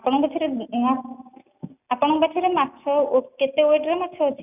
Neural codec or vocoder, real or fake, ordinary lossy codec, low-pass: none; real; none; 3.6 kHz